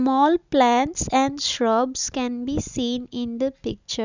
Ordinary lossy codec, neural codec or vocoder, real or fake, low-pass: none; none; real; 7.2 kHz